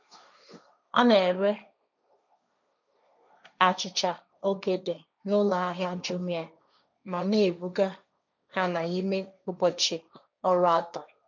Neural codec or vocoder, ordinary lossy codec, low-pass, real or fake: codec, 16 kHz, 1.1 kbps, Voila-Tokenizer; none; 7.2 kHz; fake